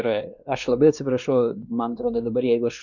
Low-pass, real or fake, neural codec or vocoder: 7.2 kHz; fake; codec, 16 kHz, 2 kbps, X-Codec, WavLM features, trained on Multilingual LibriSpeech